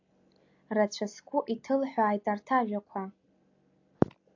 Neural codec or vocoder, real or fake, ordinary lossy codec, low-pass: none; real; AAC, 48 kbps; 7.2 kHz